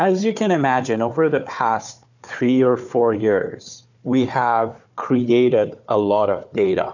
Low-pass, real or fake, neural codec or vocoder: 7.2 kHz; fake; codec, 16 kHz, 4 kbps, FunCodec, trained on Chinese and English, 50 frames a second